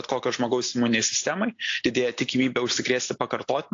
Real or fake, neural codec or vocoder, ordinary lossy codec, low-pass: real; none; AAC, 48 kbps; 7.2 kHz